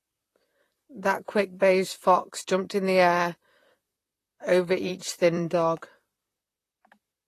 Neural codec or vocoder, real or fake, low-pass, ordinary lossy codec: vocoder, 44.1 kHz, 128 mel bands, Pupu-Vocoder; fake; 14.4 kHz; AAC, 48 kbps